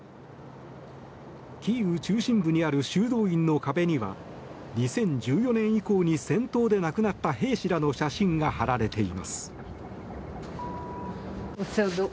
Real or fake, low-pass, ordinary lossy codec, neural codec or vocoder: real; none; none; none